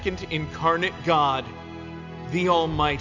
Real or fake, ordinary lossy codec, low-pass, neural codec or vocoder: real; AAC, 48 kbps; 7.2 kHz; none